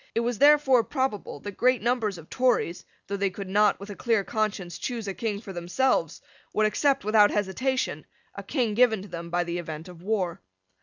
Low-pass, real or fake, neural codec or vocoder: 7.2 kHz; real; none